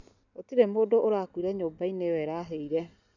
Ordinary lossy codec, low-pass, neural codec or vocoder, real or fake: none; 7.2 kHz; none; real